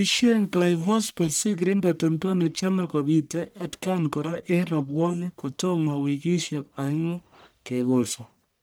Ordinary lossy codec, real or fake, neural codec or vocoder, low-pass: none; fake; codec, 44.1 kHz, 1.7 kbps, Pupu-Codec; none